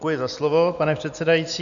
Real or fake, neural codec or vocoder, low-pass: real; none; 7.2 kHz